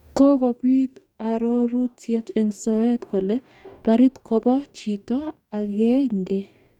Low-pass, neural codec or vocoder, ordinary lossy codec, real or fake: 19.8 kHz; codec, 44.1 kHz, 2.6 kbps, DAC; none; fake